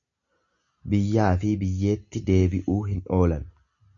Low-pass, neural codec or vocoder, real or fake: 7.2 kHz; none; real